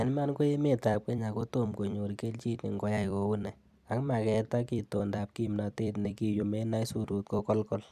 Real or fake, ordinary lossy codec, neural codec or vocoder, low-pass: fake; none; vocoder, 44.1 kHz, 128 mel bands every 256 samples, BigVGAN v2; 14.4 kHz